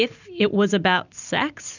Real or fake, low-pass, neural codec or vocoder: real; 7.2 kHz; none